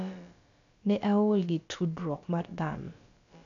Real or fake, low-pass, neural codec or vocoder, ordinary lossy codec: fake; 7.2 kHz; codec, 16 kHz, about 1 kbps, DyCAST, with the encoder's durations; MP3, 96 kbps